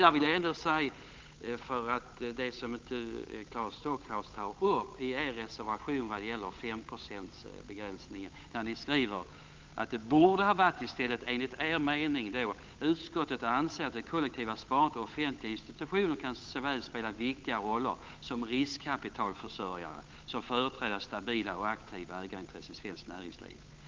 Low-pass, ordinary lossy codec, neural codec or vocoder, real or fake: 7.2 kHz; Opus, 24 kbps; codec, 16 kHz, 8 kbps, FunCodec, trained on Chinese and English, 25 frames a second; fake